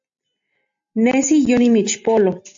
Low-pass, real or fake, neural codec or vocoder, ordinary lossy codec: 7.2 kHz; real; none; AAC, 64 kbps